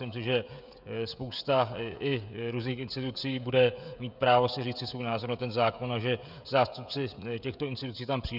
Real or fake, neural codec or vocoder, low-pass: fake; codec, 16 kHz, 16 kbps, FreqCodec, smaller model; 5.4 kHz